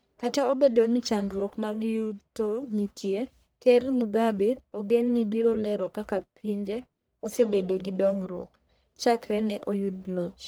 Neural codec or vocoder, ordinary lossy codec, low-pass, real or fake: codec, 44.1 kHz, 1.7 kbps, Pupu-Codec; none; none; fake